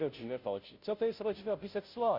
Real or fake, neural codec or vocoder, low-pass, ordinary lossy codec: fake; codec, 16 kHz, 0.5 kbps, FunCodec, trained on Chinese and English, 25 frames a second; 5.4 kHz; none